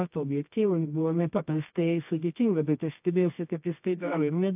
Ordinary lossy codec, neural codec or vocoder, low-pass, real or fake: AAC, 32 kbps; codec, 24 kHz, 0.9 kbps, WavTokenizer, medium music audio release; 3.6 kHz; fake